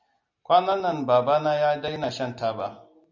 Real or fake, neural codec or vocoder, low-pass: real; none; 7.2 kHz